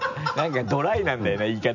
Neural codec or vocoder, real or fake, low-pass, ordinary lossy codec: none; real; 7.2 kHz; none